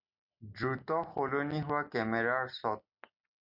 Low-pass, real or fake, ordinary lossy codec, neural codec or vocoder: 5.4 kHz; real; MP3, 48 kbps; none